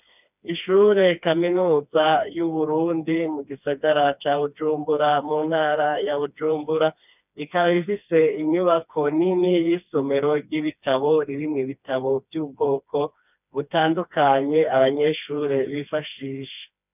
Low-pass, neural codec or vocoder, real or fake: 3.6 kHz; codec, 16 kHz, 2 kbps, FreqCodec, smaller model; fake